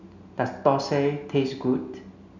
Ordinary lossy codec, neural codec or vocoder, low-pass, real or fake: none; none; 7.2 kHz; real